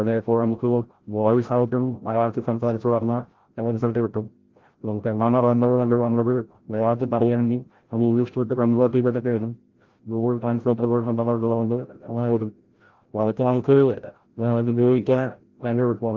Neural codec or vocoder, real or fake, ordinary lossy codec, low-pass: codec, 16 kHz, 0.5 kbps, FreqCodec, larger model; fake; Opus, 16 kbps; 7.2 kHz